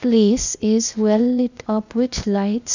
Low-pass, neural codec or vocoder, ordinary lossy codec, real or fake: 7.2 kHz; codec, 16 kHz, 0.8 kbps, ZipCodec; none; fake